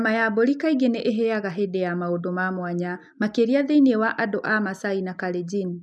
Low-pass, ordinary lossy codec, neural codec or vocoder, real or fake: none; none; none; real